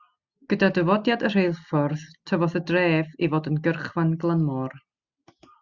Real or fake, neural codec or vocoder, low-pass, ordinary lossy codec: real; none; 7.2 kHz; Opus, 64 kbps